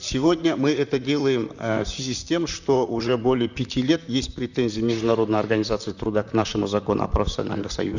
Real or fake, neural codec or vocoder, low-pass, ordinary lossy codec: fake; vocoder, 44.1 kHz, 128 mel bands, Pupu-Vocoder; 7.2 kHz; none